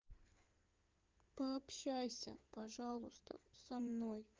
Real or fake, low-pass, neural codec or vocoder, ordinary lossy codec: fake; 7.2 kHz; codec, 16 kHz in and 24 kHz out, 2.2 kbps, FireRedTTS-2 codec; Opus, 32 kbps